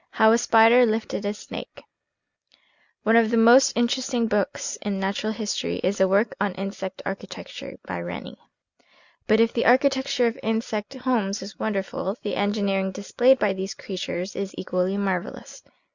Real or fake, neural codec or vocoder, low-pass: real; none; 7.2 kHz